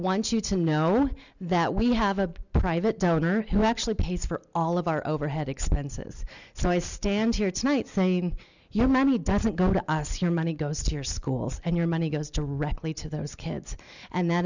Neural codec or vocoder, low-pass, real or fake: none; 7.2 kHz; real